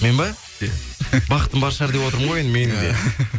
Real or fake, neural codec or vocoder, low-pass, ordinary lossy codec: real; none; none; none